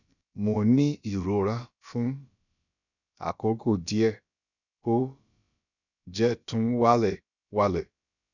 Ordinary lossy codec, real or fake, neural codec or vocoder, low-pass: none; fake; codec, 16 kHz, about 1 kbps, DyCAST, with the encoder's durations; 7.2 kHz